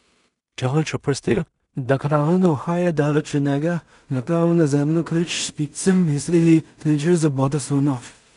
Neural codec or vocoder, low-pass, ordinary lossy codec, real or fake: codec, 16 kHz in and 24 kHz out, 0.4 kbps, LongCat-Audio-Codec, two codebook decoder; 10.8 kHz; none; fake